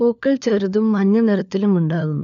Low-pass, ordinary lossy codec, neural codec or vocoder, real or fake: 7.2 kHz; none; codec, 16 kHz, 4 kbps, FunCodec, trained on LibriTTS, 50 frames a second; fake